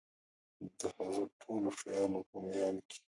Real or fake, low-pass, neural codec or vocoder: fake; 10.8 kHz; codec, 44.1 kHz, 3.4 kbps, Pupu-Codec